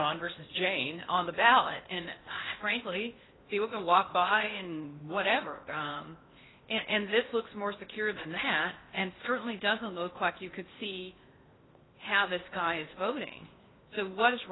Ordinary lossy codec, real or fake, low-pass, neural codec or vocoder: AAC, 16 kbps; fake; 7.2 kHz; codec, 16 kHz in and 24 kHz out, 0.8 kbps, FocalCodec, streaming, 65536 codes